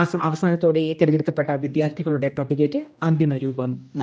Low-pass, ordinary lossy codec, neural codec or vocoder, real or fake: none; none; codec, 16 kHz, 1 kbps, X-Codec, HuBERT features, trained on general audio; fake